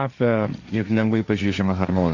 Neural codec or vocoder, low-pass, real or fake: codec, 16 kHz, 1.1 kbps, Voila-Tokenizer; 7.2 kHz; fake